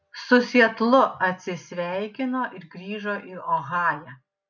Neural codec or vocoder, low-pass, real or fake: none; 7.2 kHz; real